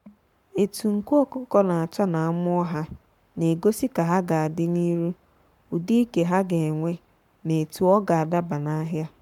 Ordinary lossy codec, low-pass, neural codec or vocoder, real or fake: MP3, 96 kbps; 19.8 kHz; codec, 44.1 kHz, 7.8 kbps, Pupu-Codec; fake